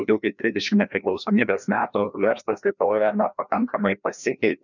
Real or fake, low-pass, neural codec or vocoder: fake; 7.2 kHz; codec, 16 kHz, 1 kbps, FreqCodec, larger model